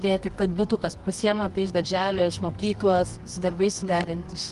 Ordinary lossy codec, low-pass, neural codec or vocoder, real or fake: Opus, 32 kbps; 10.8 kHz; codec, 24 kHz, 0.9 kbps, WavTokenizer, medium music audio release; fake